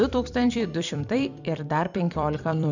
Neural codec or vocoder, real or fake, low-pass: vocoder, 44.1 kHz, 128 mel bands every 256 samples, BigVGAN v2; fake; 7.2 kHz